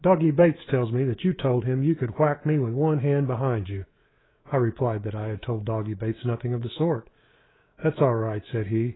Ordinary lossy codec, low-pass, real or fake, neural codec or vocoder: AAC, 16 kbps; 7.2 kHz; fake; codec, 24 kHz, 3.1 kbps, DualCodec